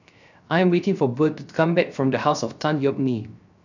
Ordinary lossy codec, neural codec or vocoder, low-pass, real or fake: none; codec, 16 kHz, 0.3 kbps, FocalCodec; 7.2 kHz; fake